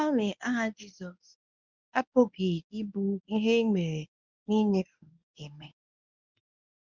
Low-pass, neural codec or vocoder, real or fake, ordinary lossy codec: 7.2 kHz; codec, 24 kHz, 0.9 kbps, WavTokenizer, medium speech release version 1; fake; none